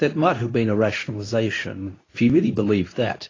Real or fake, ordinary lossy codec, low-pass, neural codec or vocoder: fake; AAC, 32 kbps; 7.2 kHz; codec, 24 kHz, 0.9 kbps, WavTokenizer, medium speech release version 2